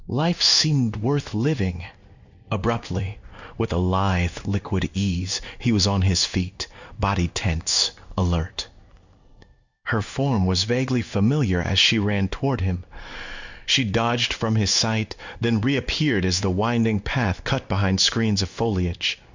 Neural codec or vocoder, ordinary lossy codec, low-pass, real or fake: codec, 16 kHz in and 24 kHz out, 1 kbps, XY-Tokenizer; Opus, 64 kbps; 7.2 kHz; fake